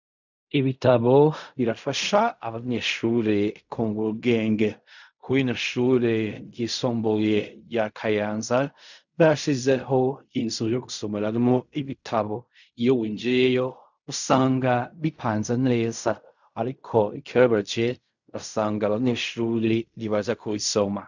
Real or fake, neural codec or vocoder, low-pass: fake; codec, 16 kHz in and 24 kHz out, 0.4 kbps, LongCat-Audio-Codec, fine tuned four codebook decoder; 7.2 kHz